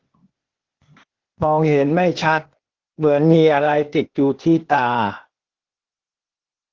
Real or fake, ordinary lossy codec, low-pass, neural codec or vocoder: fake; Opus, 16 kbps; 7.2 kHz; codec, 16 kHz, 0.8 kbps, ZipCodec